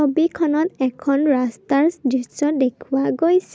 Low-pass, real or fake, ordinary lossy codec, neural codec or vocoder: none; real; none; none